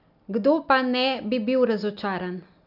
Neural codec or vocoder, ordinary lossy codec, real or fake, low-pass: none; none; real; 5.4 kHz